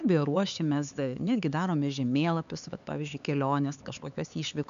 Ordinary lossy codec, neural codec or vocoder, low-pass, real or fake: MP3, 96 kbps; codec, 16 kHz, 4 kbps, X-Codec, HuBERT features, trained on LibriSpeech; 7.2 kHz; fake